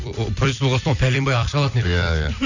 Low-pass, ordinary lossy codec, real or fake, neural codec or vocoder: 7.2 kHz; none; fake; vocoder, 44.1 kHz, 128 mel bands every 256 samples, BigVGAN v2